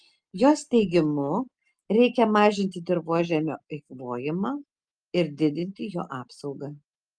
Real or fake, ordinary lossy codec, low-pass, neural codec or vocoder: real; Opus, 32 kbps; 9.9 kHz; none